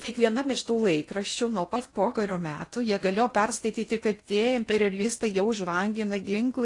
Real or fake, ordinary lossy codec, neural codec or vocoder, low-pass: fake; AAC, 48 kbps; codec, 16 kHz in and 24 kHz out, 0.8 kbps, FocalCodec, streaming, 65536 codes; 10.8 kHz